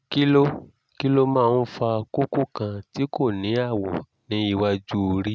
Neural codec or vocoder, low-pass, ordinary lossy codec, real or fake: none; none; none; real